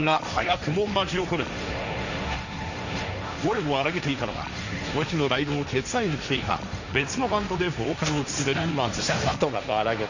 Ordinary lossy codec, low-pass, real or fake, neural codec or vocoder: none; 7.2 kHz; fake; codec, 16 kHz, 1.1 kbps, Voila-Tokenizer